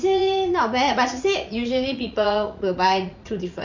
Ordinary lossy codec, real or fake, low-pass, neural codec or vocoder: Opus, 64 kbps; fake; 7.2 kHz; vocoder, 44.1 kHz, 80 mel bands, Vocos